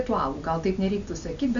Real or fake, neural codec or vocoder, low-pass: real; none; 7.2 kHz